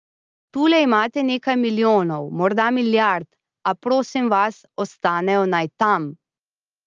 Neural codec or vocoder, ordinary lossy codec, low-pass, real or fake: none; Opus, 24 kbps; 7.2 kHz; real